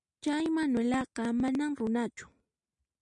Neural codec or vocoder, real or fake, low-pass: none; real; 10.8 kHz